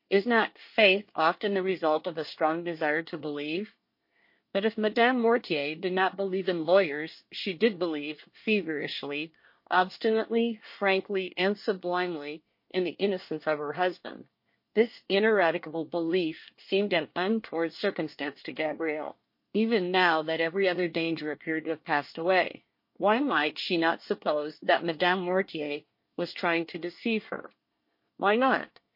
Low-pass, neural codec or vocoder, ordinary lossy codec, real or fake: 5.4 kHz; codec, 24 kHz, 1 kbps, SNAC; MP3, 32 kbps; fake